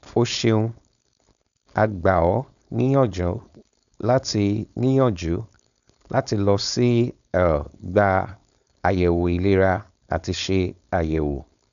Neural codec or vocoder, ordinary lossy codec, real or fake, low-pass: codec, 16 kHz, 4.8 kbps, FACodec; none; fake; 7.2 kHz